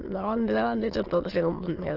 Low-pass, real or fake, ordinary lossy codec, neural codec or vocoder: 5.4 kHz; fake; Opus, 16 kbps; autoencoder, 22.05 kHz, a latent of 192 numbers a frame, VITS, trained on many speakers